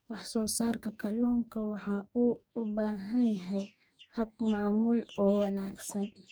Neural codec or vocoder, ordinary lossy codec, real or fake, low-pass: codec, 44.1 kHz, 2.6 kbps, DAC; none; fake; none